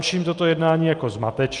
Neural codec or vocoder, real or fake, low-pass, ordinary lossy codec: none; real; 10.8 kHz; Opus, 32 kbps